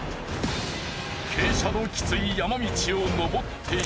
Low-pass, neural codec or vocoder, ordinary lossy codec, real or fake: none; none; none; real